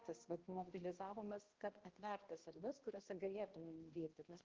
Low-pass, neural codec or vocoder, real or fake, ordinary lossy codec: 7.2 kHz; codec, 16 kHz, 1 kbps, X-Codec, HuBERT features, trained on balanced general audio; fake; Opus, 16 kbps